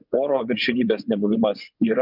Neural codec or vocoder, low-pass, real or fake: vocoder, 24 kHz, 100 mel bands, Vocos; 5.4 kHz; fake